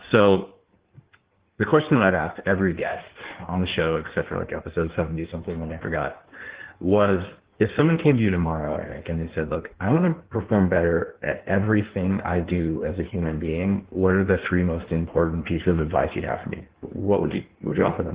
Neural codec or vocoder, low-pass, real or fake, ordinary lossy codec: codec, 16 kHz in and 24 kHz out, 1.1 kbps, FireRedTTS-2 codec; 3.6 kHz; fake; Opus, 16 kbps